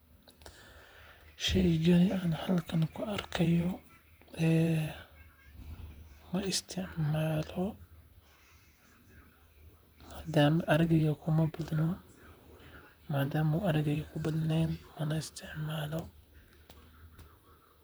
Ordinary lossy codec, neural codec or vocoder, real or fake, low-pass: none; vocoder, 44.1 kHz, 128 mel bands, Pupu-Vocoder; fake; none